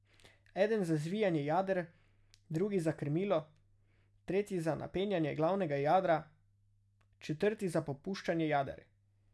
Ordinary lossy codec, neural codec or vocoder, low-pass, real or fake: none; none; none; real